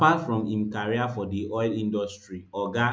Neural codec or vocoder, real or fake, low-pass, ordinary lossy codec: none; real; none; none